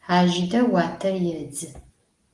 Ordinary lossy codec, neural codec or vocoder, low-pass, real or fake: Opus, 24 kbps; none; 10.8 kHz; real